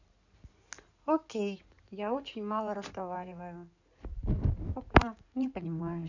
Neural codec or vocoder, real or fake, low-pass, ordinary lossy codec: codec, 16 kHz in and 24 kHz out, 2.2 kbps, FireRedTTS-2 codec; fake; 7.2 kHz; none